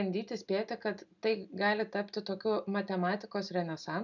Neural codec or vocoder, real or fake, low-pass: none; real; 7.2 kHz